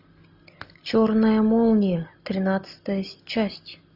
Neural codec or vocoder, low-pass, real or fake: none; 5.4 kHz; real